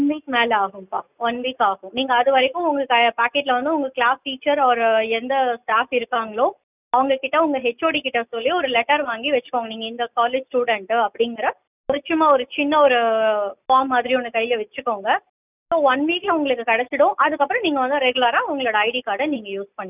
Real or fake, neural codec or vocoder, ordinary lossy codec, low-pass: real; none; none; 3.6 kHz